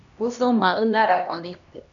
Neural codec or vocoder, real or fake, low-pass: codec, 16 kHz, 1 kbps, X-Codec, HuBERT features, trained on LibriSpeech; fake; 7.2 kHz